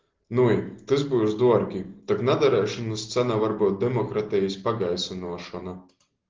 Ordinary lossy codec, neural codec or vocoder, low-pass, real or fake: Opus, 16 kbps; none; 7.2 kHz; real